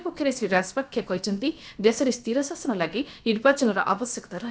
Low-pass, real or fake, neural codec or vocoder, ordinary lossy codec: none; fake; codec, 16 kHz, about 1 kbps, DyCAST, with the encoder's durations; none